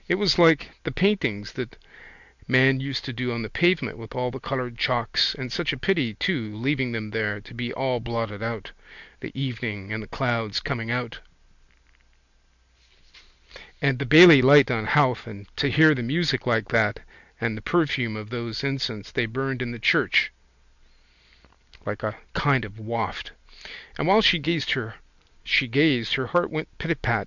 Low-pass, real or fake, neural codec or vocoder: 7.2 kHz; real; none